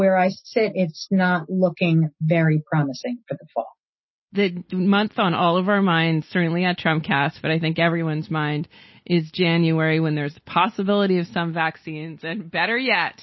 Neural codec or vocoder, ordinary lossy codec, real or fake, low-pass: none; MP3, 24 kbps; real; 7.2 kHz